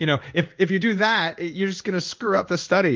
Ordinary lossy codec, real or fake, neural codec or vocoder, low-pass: Opus, 32 kbps; fake; codec, 16 kHz in and 24 kHz out, 1 kbps, XY-Tokenizer; 7.2 kHz